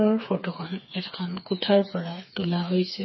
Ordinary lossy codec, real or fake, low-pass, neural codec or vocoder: MP3, 24 kbps; fake; 7.2 kHz; codec, 16 kHz, 4 kbps, FreqCodec, smaller model